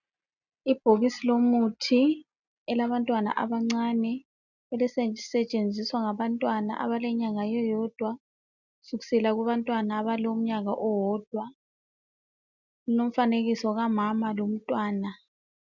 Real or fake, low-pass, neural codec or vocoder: real; 7.2 kHz; none